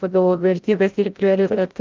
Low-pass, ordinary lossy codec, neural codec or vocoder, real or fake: 7.2 kHz; Opus, 32 kbps; codec, 16 kHz, 0.5 kbps, FreqCodec, larger model; fake